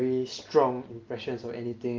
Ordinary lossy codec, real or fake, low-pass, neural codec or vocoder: Opus, 32 kbps; real; 7.2 kHz; none